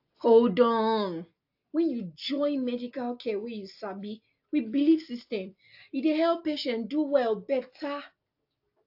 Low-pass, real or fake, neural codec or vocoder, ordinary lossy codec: 5.4 kHz; real; none; AAC, 48 kbps